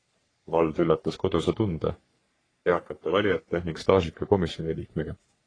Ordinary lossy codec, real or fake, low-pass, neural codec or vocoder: AAC, 32 kbps; fake; 9.9 kHz; codec, 44.1 kHz, 3.4 kbps, Pupu-Codec